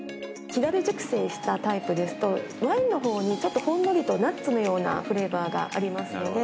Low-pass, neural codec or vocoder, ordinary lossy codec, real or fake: none; none; none; real